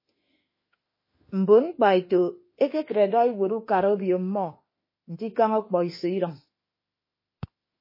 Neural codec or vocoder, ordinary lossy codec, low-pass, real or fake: autoencoder, 48 kHz, 32 numbers a frame, DAC-VAE, trained on Japanese speech; MP3, 24 kbps; 5.4 kHz; fake